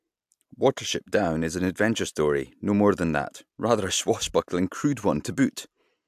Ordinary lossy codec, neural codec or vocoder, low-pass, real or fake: AAC, 96 kbps; none; 14.4 kHz; real